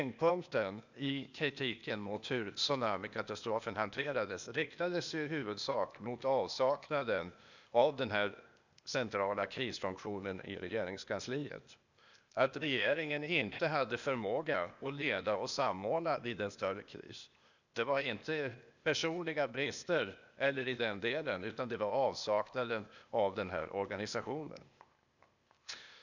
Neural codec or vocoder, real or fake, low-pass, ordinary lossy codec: codec, 16 kHz, 0.8 kbps, ZipCodec; fake; 7.2 kHz; none